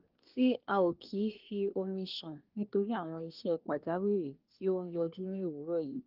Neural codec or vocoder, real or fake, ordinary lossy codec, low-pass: codec, 24 kHz, 1 kbps, SNAC; fake; Opus, 32 kbps; 5.4 kHz